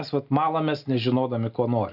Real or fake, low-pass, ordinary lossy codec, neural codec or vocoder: real; 5.4 kHz; AAC, 48 kbps; none